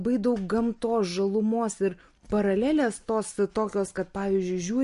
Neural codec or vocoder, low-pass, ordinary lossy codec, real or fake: none; 10.8 kHz; MP3, 48 kbps; real